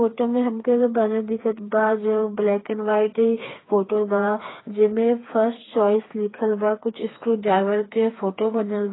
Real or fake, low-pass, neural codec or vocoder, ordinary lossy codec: fake; 7.2 kHz; codec, 16 kHz, 4 kbps, FreqCodec, smaller model; AAC, 16 kbps